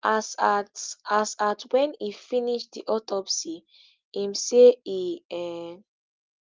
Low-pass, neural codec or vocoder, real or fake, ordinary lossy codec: 7.2 kHz; none; real; Opus, 24 kbps